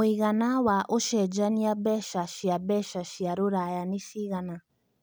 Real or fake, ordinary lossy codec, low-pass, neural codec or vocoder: real; none; none; none